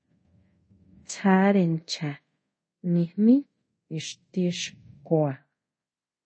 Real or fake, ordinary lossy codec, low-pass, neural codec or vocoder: fake; MP3, 32 kbps; 9.9 kHz; codec, 24 kHz, 0.5 kbps, DualCodec